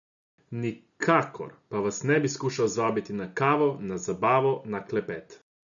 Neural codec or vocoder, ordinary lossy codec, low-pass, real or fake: none; MP3, 96 kbps; 7.2 kHz; real